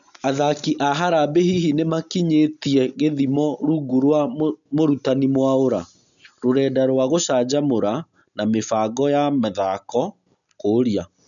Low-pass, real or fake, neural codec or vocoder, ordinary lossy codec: 7.2 kHz; real; none; none